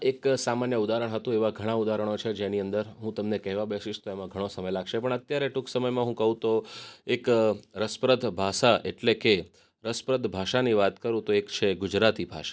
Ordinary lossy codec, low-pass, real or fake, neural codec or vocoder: none; none; real; none